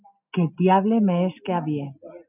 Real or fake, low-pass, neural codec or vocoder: real; 3.6 kHz; none